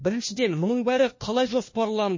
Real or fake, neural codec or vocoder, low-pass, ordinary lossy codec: fake; codec, 16 kHz, 1 kbps, FunCodec, trained on LibriTTS, 50 frames a second; 7.2 kHz; MP3, 32 kbps